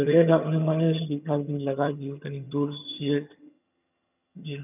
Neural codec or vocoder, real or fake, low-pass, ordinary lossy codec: vocoder, 22.05 kHz, 80 mel bands, HiFi-GAN; fake; 3.6 kHz; none